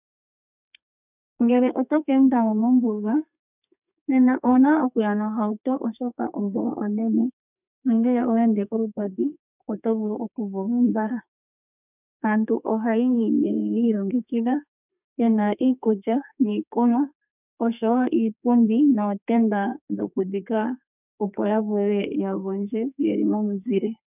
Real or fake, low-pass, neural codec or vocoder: fake; 3.6 kHz; codec, 32 kHz, 1.9 kbps, SNAC